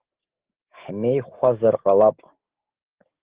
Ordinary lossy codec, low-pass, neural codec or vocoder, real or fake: Opus, 16 kbps; 3.6 kHz; codec, 16 kHz, 6 kbps, DAC; fake